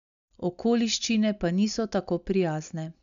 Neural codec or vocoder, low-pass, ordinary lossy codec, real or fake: none; 7.2 kHz; none; real